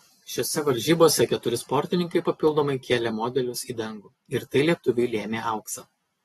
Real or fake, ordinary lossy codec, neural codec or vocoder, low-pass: real; AAC, 32 kbps; none; 19.8 kHz